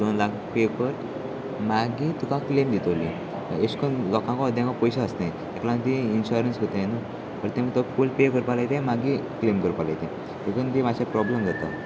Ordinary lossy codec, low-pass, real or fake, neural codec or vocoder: none; none; real; none